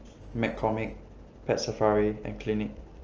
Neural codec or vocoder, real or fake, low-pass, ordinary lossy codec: none; real; 7.2 kHz; Opus, 24 kbps